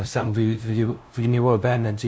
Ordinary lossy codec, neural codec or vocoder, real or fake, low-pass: none; codec, 16 kHz, 0.5 kbps, FunCodec, trained on LibriTTS, 25 frames a second; fake; none